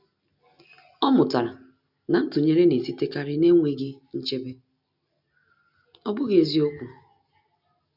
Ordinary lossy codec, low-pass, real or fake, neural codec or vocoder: none; 5.4 kHz; real; none